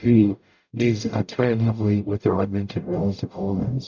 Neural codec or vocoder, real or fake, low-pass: codec, 44.1 kHz, 0.9 kbps, DAC; fake; 7.2 kHz